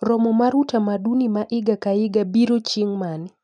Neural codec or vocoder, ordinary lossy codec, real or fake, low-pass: none; none; real; 9.9 kHz